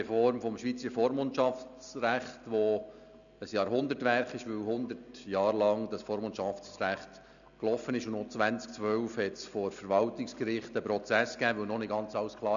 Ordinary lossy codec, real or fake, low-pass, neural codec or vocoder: none; real; 7.2 kHz; none